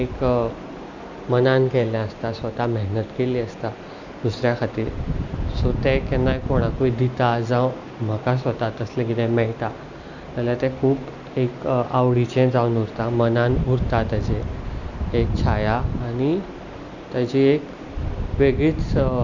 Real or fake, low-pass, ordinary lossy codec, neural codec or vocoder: real; 7.2 kHz; none; none